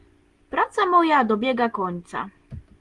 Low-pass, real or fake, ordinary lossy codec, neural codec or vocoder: 10.8 kHz; real; Opus, 24 kbps; none